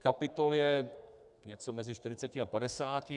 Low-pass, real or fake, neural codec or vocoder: 10.8 kHz; fake; codec, 32 kHz, 1.9 kbps, SNAC